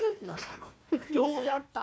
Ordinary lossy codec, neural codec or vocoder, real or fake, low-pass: none; codec, 16 kHz, 1 kbps, FunCodec, trained on Chinese and English, 50 frames a second; fake; none